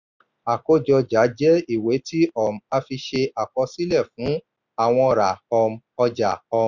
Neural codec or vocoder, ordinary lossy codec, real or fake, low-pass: none; none; real; 7.2 kHz